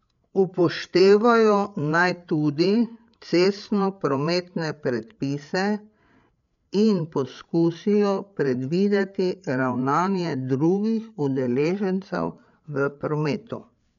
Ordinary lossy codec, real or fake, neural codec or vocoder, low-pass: none; fake; codec, 16 kHz, 8 kbps, FreqCodec, larger model; 7.2 kHz